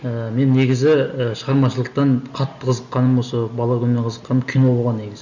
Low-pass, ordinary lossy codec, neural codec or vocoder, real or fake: 7.2 kHz; none; none; real